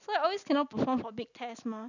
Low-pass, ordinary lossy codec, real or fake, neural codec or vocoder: 7.2 kHz; none; real; none